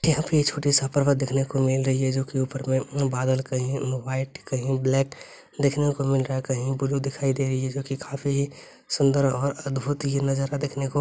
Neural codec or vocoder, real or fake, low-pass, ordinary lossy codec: none; real; none; none